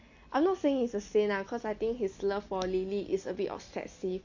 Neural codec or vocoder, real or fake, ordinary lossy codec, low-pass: none; real; AAC, 48 kbps; 7.2 kHz